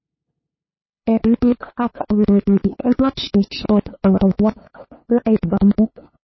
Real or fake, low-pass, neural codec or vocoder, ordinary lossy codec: fake; 7.2 kHz; codec, 16 kHz, 2 kbps, FunCodec, trained on LibriTTS, 25 frames a second; MP3, 24 kbps